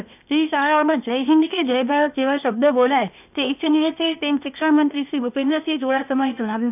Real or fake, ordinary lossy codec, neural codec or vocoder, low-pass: fake; none; codec, 16 kHz, 0.8 kbps, ZipCodec; 3.6 kHz